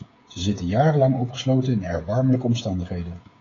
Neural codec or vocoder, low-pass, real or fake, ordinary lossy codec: codec, 16 kHz, 16 kbps, FreqCodec, smaller model; 7.2 kHz; fake; AAC, 32 kbps